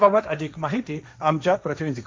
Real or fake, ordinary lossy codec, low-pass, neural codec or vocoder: fake; none; none; codec, 16 kHz, 1.1 kbps, Voila-Tokenizer